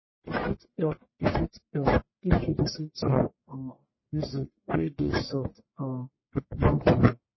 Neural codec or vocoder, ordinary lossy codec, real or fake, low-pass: codec, 44.1 kHz, 1.7 kbps, Pupu-Codec; MP3, 24 kbps; fake; 7.2 kHz